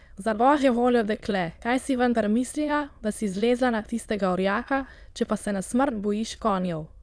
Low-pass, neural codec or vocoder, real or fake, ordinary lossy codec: none; autoencoder, 22.05 kHz, a latent of 192 numbers a frame, VITS, trained on many speakers; fake; none